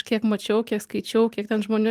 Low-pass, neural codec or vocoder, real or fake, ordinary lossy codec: 14.4 kHz; none; real; Opus, 32 kbps